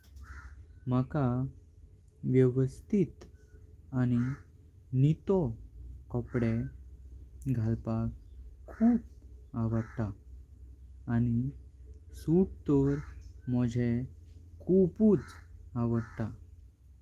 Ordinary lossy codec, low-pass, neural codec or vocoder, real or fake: Opus, 24 kbps; 14.4 kHz; none; real